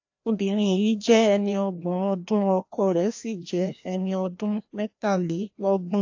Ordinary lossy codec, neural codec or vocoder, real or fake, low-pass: AAC, 48 kbps; codec, 16 kHz, 1 kbps, FreqCodec, larger model; fake; 7.2 kHz